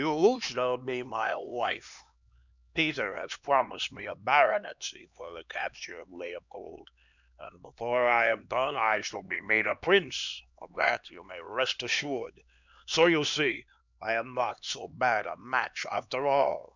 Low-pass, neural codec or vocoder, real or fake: 7.2 kHz; codec, 16 kHz, 2 kbps, X-Codec, HuBERT features, trained on LibriSpeech; fake